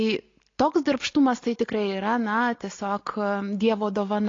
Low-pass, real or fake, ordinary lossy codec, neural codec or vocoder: 7.2 kHz; real; AAC, 32 kbps; none